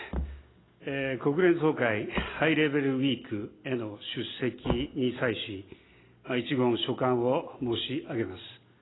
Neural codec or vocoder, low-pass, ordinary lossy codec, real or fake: none; 7.2 kHz; AAC, 16 kbps; real